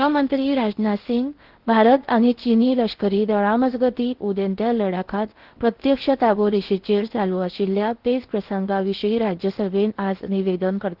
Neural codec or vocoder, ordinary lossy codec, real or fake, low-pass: codec, 16 kHz in and 24 kHz out, 0.8 kbps, FocalCodec, streaming, 65536 codes; Opus, 16 kbps; fake; 5.4 kHz